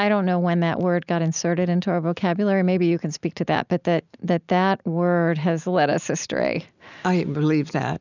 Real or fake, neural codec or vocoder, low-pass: real; none; 7.2 kHz